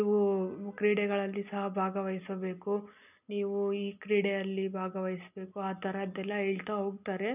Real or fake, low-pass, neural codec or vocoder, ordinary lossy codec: real; 3.6 kHz; none; none